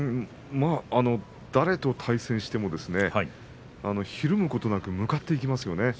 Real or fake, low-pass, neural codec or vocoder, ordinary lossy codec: real; none; none; none